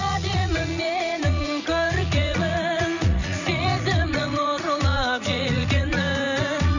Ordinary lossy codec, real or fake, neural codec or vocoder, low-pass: none; fake; vocoder, 44.1 kHz, 80 mel bands, Vocos; 7.2 kHz